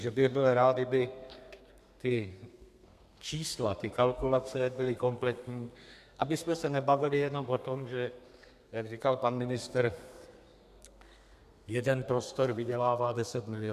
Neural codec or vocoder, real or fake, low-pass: codec, 32 kHz, 1.9 kbps, SNAC; fake; 14.4 kHz